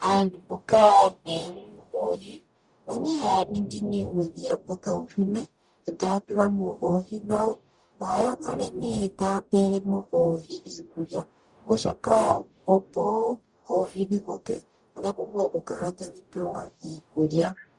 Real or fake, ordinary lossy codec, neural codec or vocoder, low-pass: fake; Opus, 64 kbps; codec, 44.1 kHz, 0.9 kbps, DAC; 10.8 kHz